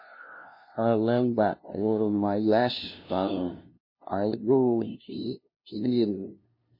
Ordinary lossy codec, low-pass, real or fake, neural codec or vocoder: MP3, 24 kbps; 5.4 kHz; fake; codec, 16 kHz, 0.5 kbps, FunCodec, trained on LibriTTS, 25 frames a second